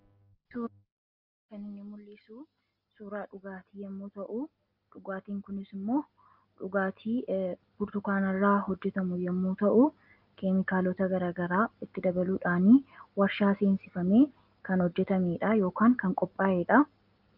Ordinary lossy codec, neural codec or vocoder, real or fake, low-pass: Opus, 32 kbps; none; real; 5.4 kHz